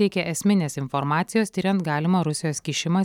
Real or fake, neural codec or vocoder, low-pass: real; none; 19.8 kHz